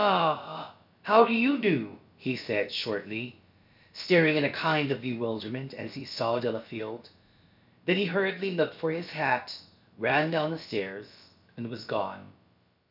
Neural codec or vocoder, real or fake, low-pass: codec, 16 kHz, about 1 kbps, DyCAST, with the encoder's durations; fake; 5.4 kHz